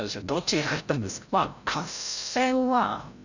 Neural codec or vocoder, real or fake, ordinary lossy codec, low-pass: codec, 16 kHz, 0.5 kbps, FreqCodec, larger model; fake; none; 7.2 kHz